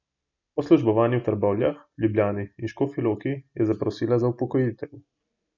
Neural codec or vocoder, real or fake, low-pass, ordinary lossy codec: none; real; 7.2 kHz; none